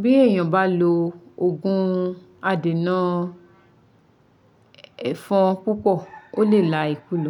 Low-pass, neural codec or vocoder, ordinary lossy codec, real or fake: 19.8 kHz; none; none; real